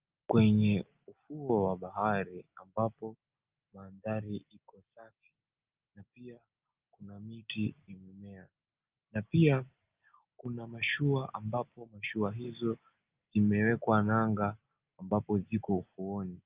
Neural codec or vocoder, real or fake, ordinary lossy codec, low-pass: none; real; Opus, 32 kbps; 3.6 kHz